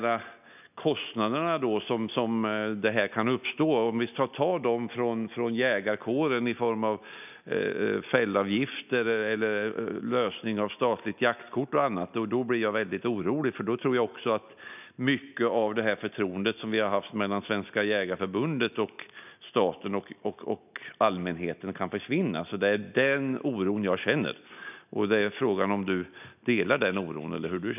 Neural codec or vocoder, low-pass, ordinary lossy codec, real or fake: none; 3.6 kHz; none; real